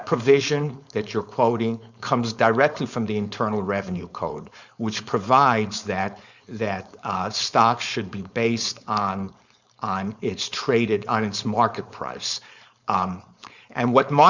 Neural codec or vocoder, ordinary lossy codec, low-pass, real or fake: codec, 16 kHz, 4.8 kbps, FACodec; Opus, 64 kbps; 7.2 kHz; fake